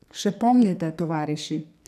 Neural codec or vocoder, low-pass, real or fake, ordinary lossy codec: codec, 44.1 kHz, 2.6 kbps, SNAC; 14.4 kHz; fake; none